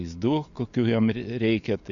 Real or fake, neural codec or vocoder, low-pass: real; none; 7.2 kHz